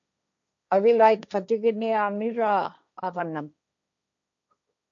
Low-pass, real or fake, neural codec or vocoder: 7.2 kHz; fake; codec, 16 kHz, 1.1 kbps, Voila-Tokenizer